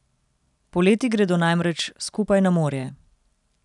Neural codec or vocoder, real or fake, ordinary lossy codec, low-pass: none; real; none; 10.8 kHz